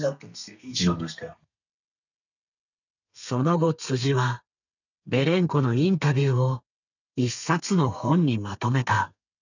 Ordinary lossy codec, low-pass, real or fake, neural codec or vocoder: none; 7.2 kHz; fake; codec, 32 kHz, 1.9 kbps, SNAC